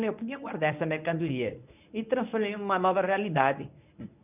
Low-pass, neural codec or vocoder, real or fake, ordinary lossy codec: 3.6 kHz; codec, 24 kHz, 0.9 kbps, WavTokenizer, medium speech release version 1; fake; none